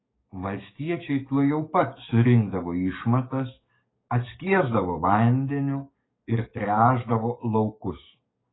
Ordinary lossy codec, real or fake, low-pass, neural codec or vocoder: AAC, 16 kbps; fake; 7.2 kHz; codec, 44.1 kHz, 7.8 kbps, DAC